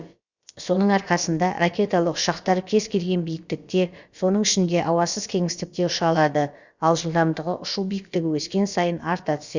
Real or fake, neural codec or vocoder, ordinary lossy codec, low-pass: fake; codec, 16 kHz, about 1 kbps, DyCAST, with the encoder's durations; Opus, 64 kbps; 7.2 kHz